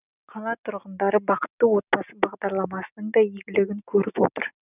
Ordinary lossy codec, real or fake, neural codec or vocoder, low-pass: none; real; none; 3.6 kHz